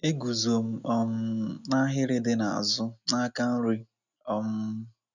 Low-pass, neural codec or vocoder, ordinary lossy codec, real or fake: 7.2 kHz; none; none; real